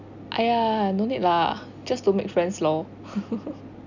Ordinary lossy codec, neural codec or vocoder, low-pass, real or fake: none; none; 7.2 kHz; real